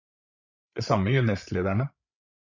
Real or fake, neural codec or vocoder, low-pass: fake; codec, 16 kHz, 8 kbps, FreqCodec, larger model; 7.2 kHz